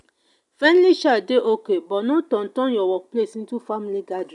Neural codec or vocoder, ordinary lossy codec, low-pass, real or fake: none; none; 10.8 kHz; real